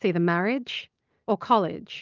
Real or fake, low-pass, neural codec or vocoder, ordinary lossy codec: real; 7.2 kHz; none; Opus, 32 kbps